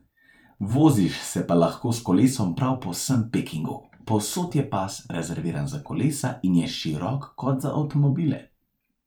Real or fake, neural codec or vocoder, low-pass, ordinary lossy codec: fake; vocoder, 44.1 kHz, 128 mel bands every 512 samples, BigVGAN v2; 19.8 kHz; none